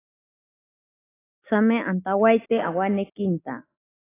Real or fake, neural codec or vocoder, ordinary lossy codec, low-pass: real; none; AAC, 16 kbps; 3.6 kHz